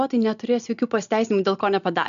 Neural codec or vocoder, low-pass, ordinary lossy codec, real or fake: none; 7.2 kHz; AAC, 96 kbps; real